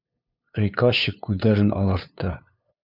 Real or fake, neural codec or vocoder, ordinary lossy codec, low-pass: fake; codec, 16 kHz, 2 kbps, FunCodec, trained on LibriTTS, 25 frames a second; Opus, 64 kbps; 5.4 kHz